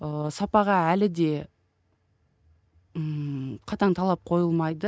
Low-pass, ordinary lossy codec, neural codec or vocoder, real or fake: none; none; none; real